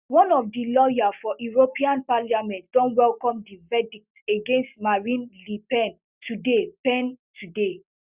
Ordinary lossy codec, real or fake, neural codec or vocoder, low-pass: Opus, 64 kbps; real; none; 3.6 kHz